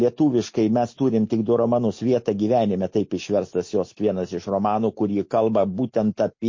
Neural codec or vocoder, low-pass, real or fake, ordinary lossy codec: none; 7.2 kHz; real; MP3, 32 kbps